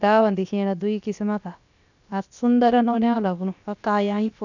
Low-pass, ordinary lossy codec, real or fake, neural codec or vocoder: 7.2 kHz; none; fake; codec, 16 kHz, about 1 kbps, DyCAST, with the encoder's durations